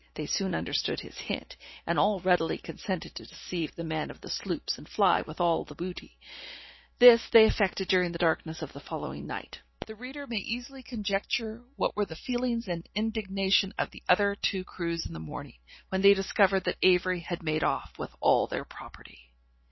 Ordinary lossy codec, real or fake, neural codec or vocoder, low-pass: MP3, 24 kbps; real; none; 7.2 kHz